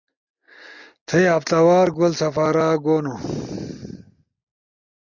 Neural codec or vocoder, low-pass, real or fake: none; 7.2 kHz; real